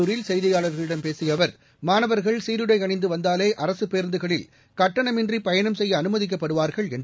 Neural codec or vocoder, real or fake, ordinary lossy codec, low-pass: none; real; none; none